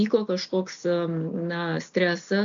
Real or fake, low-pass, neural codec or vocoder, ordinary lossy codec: real; 7.2 kHz; none; AAC, 64 kbps